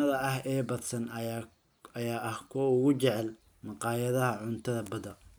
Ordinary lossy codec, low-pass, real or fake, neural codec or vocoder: none; none; real; none